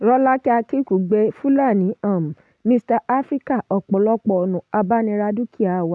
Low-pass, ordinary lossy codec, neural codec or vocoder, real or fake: none; none; none; real